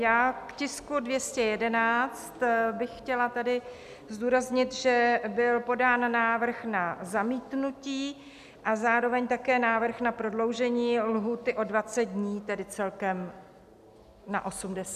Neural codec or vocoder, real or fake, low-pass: none; real; 14.4 kHz